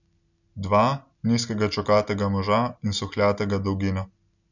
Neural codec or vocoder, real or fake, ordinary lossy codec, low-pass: none; real; none; 7.2 kHz